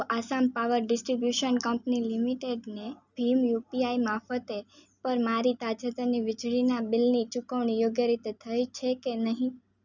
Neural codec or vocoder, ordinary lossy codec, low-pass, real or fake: none; none; 7.2 kHz; real